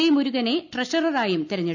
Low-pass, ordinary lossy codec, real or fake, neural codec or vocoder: 7.2 kHz; none; real; none